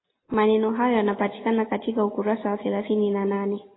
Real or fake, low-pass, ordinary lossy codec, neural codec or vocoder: real; 7.2 kHz; AAC, 16 kbps; none